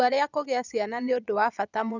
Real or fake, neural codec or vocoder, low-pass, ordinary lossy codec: fake; vocoder, 22.05 kHz, 80 mel bands, Vocos; 7.2 kHz; none